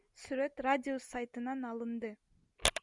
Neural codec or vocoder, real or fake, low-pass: none; real; 10.8 kHz